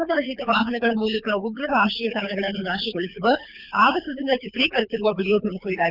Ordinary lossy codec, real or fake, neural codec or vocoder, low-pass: none; fake; codec, 24 kHz, 6 kbps, HILCodec; 5.4 kHz